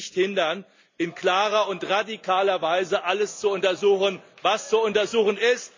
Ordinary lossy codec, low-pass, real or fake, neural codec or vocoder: MP3, 64 kbps; 7.2 kHz; real; none